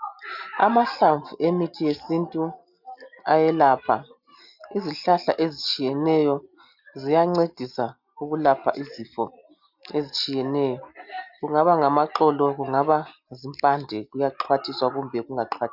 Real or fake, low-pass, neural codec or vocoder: real; 5.4 kHz; none